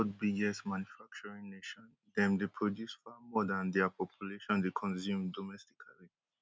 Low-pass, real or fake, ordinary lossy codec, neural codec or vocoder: none; real; none; none